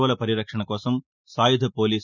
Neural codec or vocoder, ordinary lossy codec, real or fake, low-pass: none; none; real; 7.2 kHz